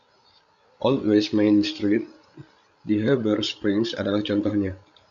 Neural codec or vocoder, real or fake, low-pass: codec, 16 kHz, 16 kbps, FreqCodec, larger model; fake; 7.2 kHz